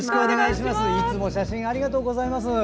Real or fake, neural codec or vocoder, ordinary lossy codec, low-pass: real; none; none; none